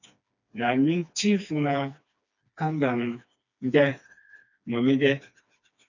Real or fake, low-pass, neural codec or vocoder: fake; 7.2 kHz; codec, 16 kHz, 2 kbps, FreqCodec, smaller model